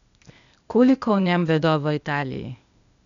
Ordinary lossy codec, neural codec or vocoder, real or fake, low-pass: none; codec, 16 kHz, 0.8 kbps, ZipCodec; fake; 7.2 kHz